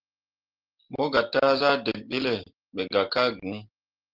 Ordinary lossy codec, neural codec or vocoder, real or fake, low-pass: Opus, 16 kbps; none; real; 5.4 kHz